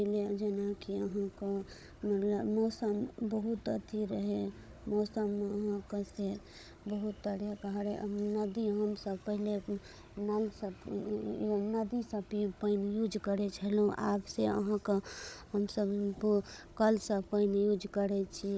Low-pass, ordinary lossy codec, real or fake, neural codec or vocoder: none; none; fake; codec, 16 kHz, 16 kbps, FunCodec, trained on Chinese and English, 50 frames a second